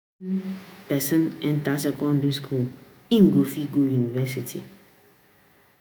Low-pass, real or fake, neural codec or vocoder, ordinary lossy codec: none; fake; autoencoder, 48 kHz, 128 numbers a frame, DAC-VAE, trained on Japanese speech; none